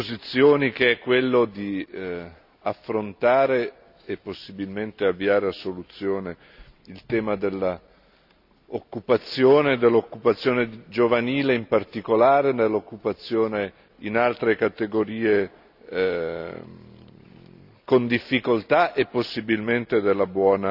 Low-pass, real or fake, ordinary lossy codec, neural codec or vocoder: 5.4 kHz; real; none; none